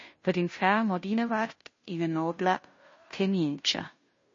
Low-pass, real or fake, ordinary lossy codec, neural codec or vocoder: 7.2 kHz; fake; MP3, 32 kbps; codec, 16 kHz, 0.5 kbps, FunCodec, trained on Chinese and English, 25 frames a second